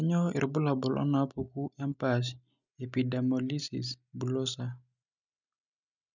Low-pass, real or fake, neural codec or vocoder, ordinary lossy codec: 7.2 kHz; real; none; none